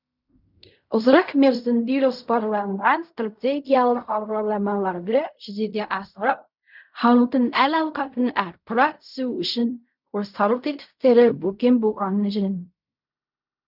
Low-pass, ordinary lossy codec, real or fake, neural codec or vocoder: 5.4 kHz; none; fake; codec, 16 kHz in and 24 kHz out, 0.4 kbps, LongCat-Audio-Codec, fine tuned four codebook decoder